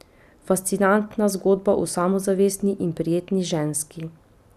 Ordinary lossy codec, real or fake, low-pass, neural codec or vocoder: none; real; 14.4 kHz; none